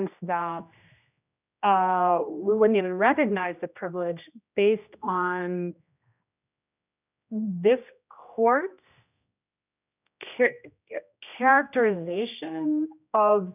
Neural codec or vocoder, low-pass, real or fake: codec, 16 kHz, 1 kbps, X-Codec, HuBERT features, trained on general audio; 3.6 kHz; fake